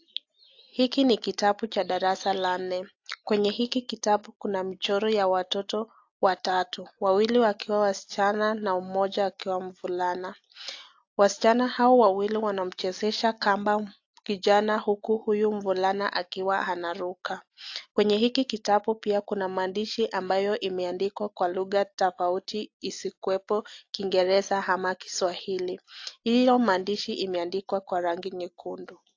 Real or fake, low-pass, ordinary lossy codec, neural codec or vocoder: real; 7.2 kHz; AAC, 48 kbps; none